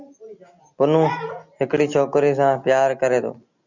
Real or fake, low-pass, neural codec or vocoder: real; 7.2 kHz; none